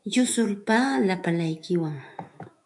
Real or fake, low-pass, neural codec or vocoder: fake; 10.8 kHz; autoencoder, 48 kHz, 128 numbers a frame, DAC-VAE, trained on Japanese speech